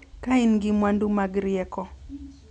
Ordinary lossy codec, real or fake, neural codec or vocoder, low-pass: none; real; none; 10.8 kHz